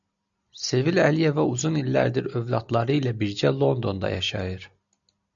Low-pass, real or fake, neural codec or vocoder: 7.2 kHz; real; none